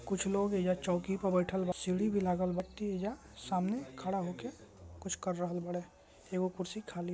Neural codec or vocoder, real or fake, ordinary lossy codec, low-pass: none; real; none; none